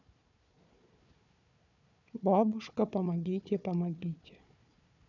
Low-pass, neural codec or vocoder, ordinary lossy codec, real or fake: 7.2 kHz; codec, 16 kHz, 4 kbps, FunCodec, trained on Chinese and English, 50 frames a second; none; fake